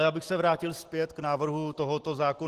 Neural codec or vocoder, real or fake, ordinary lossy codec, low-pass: none; real; Opus, 16 kbps; 14.4 kHz